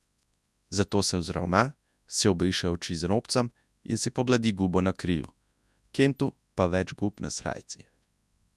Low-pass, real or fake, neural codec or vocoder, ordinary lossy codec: none; fake; codec, 24 kHz, 0.9 kbps, WavTokenizer, large speech release; none